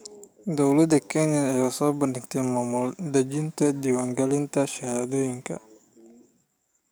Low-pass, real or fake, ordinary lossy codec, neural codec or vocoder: none; fake; none; codec, 44.1 kHz, 7.8 kbps, DAC